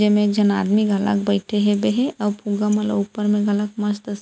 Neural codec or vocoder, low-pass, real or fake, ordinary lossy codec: none; none; real; none